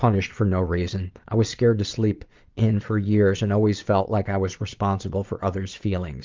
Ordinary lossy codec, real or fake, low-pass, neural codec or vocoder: Opus, 32 kbps; fake; 7.2 kHz; vocoder, 22.05 kHz, 80 mel bands, Vocos